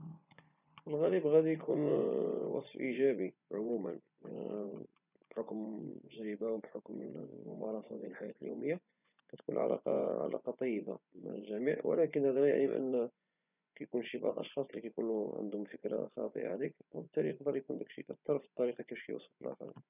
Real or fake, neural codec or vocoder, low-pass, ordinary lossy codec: fake; vocoder, 24 kHz, 100 mel bands, Vocos; 3.6 kHz; none